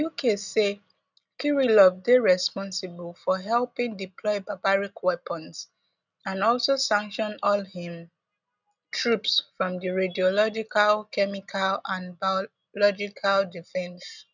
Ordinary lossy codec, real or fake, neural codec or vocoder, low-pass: none; real; none; 7.2 kHz